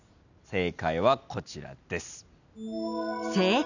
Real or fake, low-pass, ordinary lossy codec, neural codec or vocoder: real; 7.2 kHz; none; none